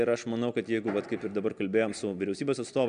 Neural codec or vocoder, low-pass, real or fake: none; 9.9 kHz; real